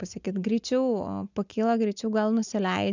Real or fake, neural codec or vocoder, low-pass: real; none; 7.2 kHz